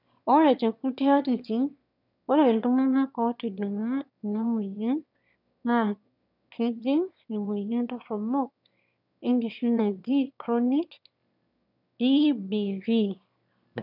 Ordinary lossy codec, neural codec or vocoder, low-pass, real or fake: none; autoencoder, 22.05 kHz, a latent of 192 numbers a frame, VITS, trained on one speaker; 5.4 kHz; fake